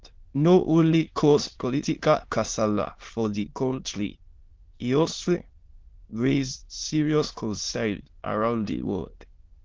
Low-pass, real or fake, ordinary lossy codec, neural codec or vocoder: 7.2 kHz; fake; Opus, 16 kbps; autoencoder, 22.05 kHz, a latent of 192 numbers a frame, VITS, trained on many speakers